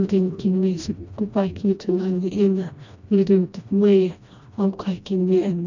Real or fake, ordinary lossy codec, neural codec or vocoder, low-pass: fake; none; codec, 16 kHz, 1 kbps, FreqCodec, smaller model; 7.2 kHz